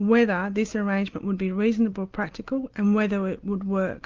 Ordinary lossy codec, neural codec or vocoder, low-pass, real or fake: Opus, 24 kbps; none; 7.2 kHz; real